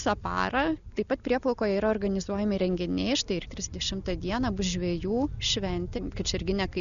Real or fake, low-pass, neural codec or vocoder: real; 7.2 kHz; none